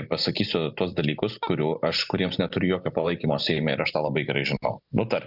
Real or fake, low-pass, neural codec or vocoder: real; 5.4 kHz; none